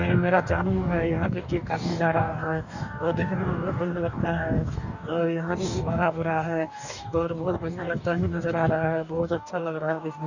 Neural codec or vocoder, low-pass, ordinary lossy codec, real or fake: codec, 44.1 kHz, 2.6 kbps, DAC; 7.2 kHz; none; fake